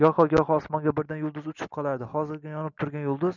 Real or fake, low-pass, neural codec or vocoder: real; 7.2 kHz; none